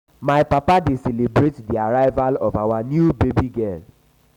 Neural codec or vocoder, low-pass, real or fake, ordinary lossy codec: none; 19.8 kHz; real; none